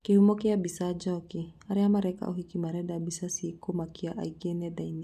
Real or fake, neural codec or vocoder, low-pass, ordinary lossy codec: real; none; 14.4 kHz; none